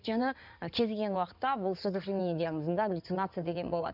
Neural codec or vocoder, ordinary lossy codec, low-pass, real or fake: codec, 16 kHz in and 24 kHz out, 2.2 kbps, FireRedTTS-2 codec; none; 5.4 kHz; fake